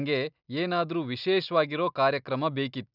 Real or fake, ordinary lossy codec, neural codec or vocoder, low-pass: real; none; none; 5.4 kHz